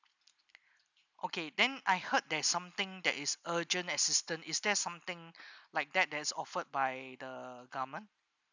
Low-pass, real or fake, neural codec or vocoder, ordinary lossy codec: 7.2 kHz; real; none; none